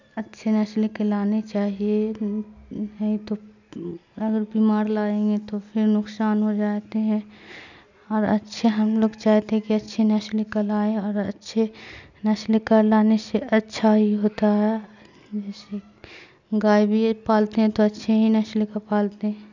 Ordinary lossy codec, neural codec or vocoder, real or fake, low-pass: none; none; real; 7.2 kHz